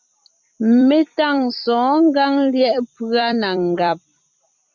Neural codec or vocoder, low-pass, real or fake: vocoder, 44.1 kHz, 128 mel bands every 256 samples, BigVGAN v2; 7.2 kHz; fake